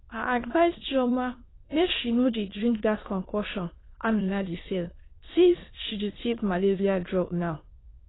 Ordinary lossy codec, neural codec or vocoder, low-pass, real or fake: AAC, 16 kbps; autoencoder, 22.05 kHz, a latent of 192 numbers a frame, VITS, trained on many speakers; 7.2 kHz; fake